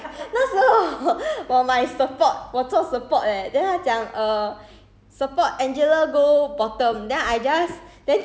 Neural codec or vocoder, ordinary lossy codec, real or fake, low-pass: none; none; real; none